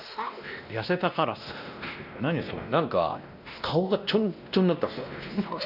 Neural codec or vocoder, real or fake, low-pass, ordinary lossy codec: codec, 16 kHz, 1 kbps, X-Codec, WavLM features, trained on Multilingual LibriSpeech; fake; 5.4 kHz; none